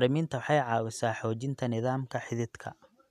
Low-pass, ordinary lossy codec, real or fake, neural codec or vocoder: 14.4 kHz; none; real; none